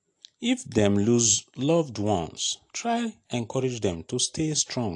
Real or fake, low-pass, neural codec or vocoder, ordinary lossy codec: real; 10.8 kHz; none; AAC, 48 kbps